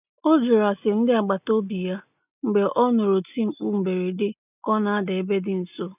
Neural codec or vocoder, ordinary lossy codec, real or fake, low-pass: none; none; real; 3.6 kHz